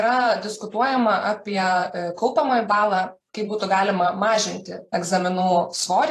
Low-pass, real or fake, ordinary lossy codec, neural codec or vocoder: 14.4 kHz; fake; AAC, 48 kbps; vocoder, 44.1 kHz, 128 mel bands every 512 samples, BigVGAN v2